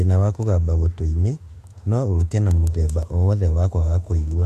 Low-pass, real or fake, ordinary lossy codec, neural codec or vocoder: 14.4 kHz; fake; MP3, 64 kbps; autoencoder, 48 kHz, 32 numbers a frame, DAC-VAE, trained on Japanese speech